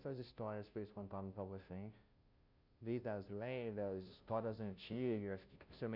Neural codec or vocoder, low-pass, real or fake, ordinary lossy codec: codec, 16 kHz, 0.5 kbps, FunCodec, trained on Chinese and English, 25 frames a second; 5.4 kHz; fake; none